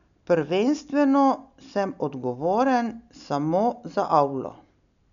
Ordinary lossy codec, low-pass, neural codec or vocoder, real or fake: none; 7.2 kHz; none; real